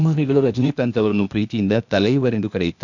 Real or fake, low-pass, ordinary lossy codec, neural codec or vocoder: fake; 7.2 kHz; none; codec, 16 kHz, 0.8 kbps, ZipCodec